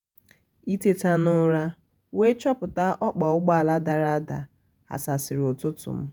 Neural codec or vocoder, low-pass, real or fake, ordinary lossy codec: vocoder, 48 kHz, 128 mel bands, Vocos; none; fake; none